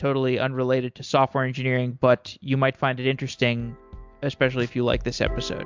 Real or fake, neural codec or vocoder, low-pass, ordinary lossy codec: real; none; 7.2 kHz; MP3, 64 kbps